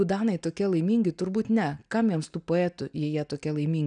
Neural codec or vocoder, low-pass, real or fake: none; 9.9 kHz; real